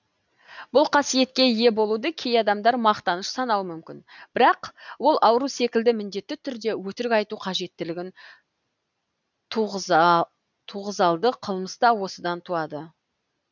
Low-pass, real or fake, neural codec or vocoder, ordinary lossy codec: 7.2 kHz; real; none; none